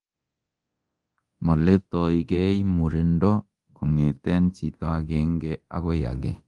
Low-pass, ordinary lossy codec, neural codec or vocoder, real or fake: 10.8 kHz; Opus, 24 kbps; codec, 24 kHz, 0.9 kbps, DualCodec; fake